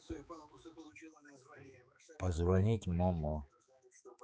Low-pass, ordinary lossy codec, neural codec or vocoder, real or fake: none; none; codec, 16 kHz, 4 kbps, X-Codec, HuBERT features, trained on balanced general audio; fake